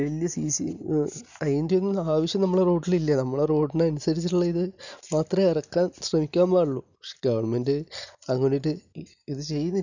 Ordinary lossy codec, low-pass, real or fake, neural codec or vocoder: none; 7.2 kHz; real; none